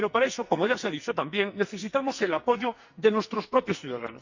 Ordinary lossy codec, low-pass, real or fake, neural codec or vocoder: Opus, 64 kbps; 7.2 kHz; fake; codec, 44.1 kHz, 2.6 kbps, SNAC